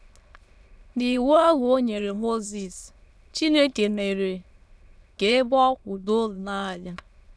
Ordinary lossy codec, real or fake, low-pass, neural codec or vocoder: none; fake; none; autoencoder, 22.05 kHz, a latent of 192 numbers a frame, VITS, trained on many speakers